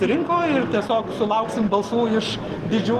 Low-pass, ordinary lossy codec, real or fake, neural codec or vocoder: 14.4 kHz; Opus, 16 kbps; real; none